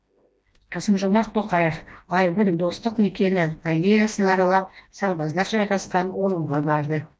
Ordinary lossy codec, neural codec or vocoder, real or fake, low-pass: none; codec, 16 kHz, 1 kbps, FreqCodec, smaller model; fake; none